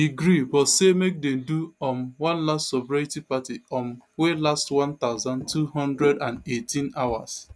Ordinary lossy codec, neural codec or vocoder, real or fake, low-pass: none; none; real; none